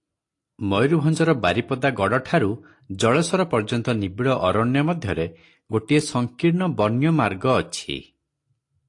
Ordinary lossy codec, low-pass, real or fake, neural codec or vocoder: AAC, 48 kbps; 10.8 kHz; real; none